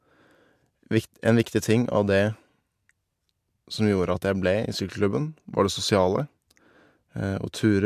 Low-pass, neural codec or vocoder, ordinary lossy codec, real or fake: 14.4 kHz; none; MP3, 96 kbps; real